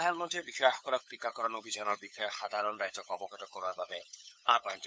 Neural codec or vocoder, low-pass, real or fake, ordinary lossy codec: codec, 16 kHz, 8 kbps, FunCodec, trained on LibriTTS, 25 frames a second; none; fake; none